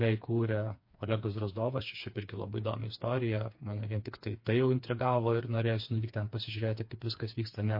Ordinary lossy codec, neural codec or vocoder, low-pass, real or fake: MP3, 32 kbps; codec, 16 kHz, 4 kbps, FreqCodec, smaller model; 5.4 kHz; fake